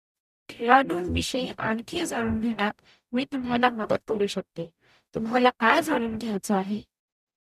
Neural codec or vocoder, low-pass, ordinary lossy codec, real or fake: codec, 44.1 kHz, 0.9 kbps, DAC; 14.4 kHz; none; fake